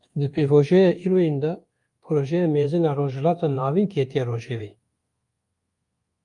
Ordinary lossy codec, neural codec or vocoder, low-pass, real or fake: Opus, 32 kbps; codec, 24 kHz, 1.2 kbps, DualCodec; 10.8 kHz; fake